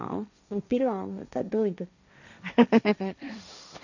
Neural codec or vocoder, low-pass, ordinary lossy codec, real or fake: codec, 16 kHz, 1.1 kbps, Voila-Tokenizer; 7.2 kHz; none; fake